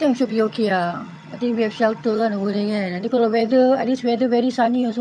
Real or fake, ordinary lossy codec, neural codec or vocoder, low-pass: fake; none; vocoder, 22.05 kHz, 80 mel bands, HiFi-GAN; none